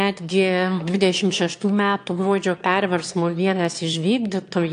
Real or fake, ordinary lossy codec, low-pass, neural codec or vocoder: fake; AAC, 64 kbps; 9.9 kHz; autoencoder, 22.05 kHz, a latent of 192 numbers a frame, VITS, trained on one speaker